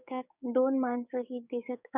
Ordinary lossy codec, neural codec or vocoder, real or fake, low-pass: none; codec, 16 kHz, 6 kbps, DAC; fake; 3.6 kHz